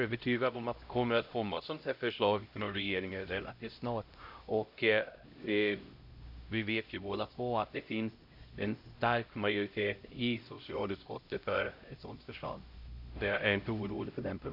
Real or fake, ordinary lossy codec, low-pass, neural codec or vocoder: fake; AAC, 48 kbps; 5.4 kHz; codec, 16 kHz, 0.5 kbps, X-Codec, HuBERT features, trained on LibriSpeech